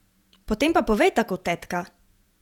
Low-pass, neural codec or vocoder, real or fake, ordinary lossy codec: 19.8 kHz; vocoder, 48 kHz, 128 mel bands, Vocos; fake; none